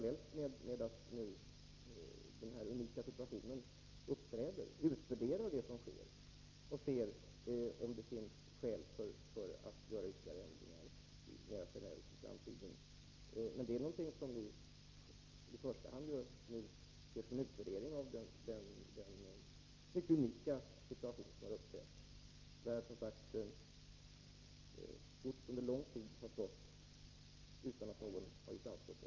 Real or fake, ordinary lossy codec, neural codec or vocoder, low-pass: real; Opus, 24 kbps; none; 7.2 kHz